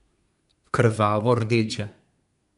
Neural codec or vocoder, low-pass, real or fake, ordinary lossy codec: codec, 24 kHz, 1 kbps, SNAC; 10.8 kHz; fake; none